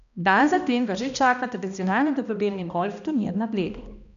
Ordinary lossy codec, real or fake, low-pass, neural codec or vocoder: none; fake; 7.2 kHz; codec, 16 kHz, 1 kbps, X-Codec, HuBERT features, trained on balanced general audio